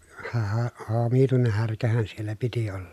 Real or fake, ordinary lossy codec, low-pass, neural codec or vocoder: real; none; 14.4 kHz; none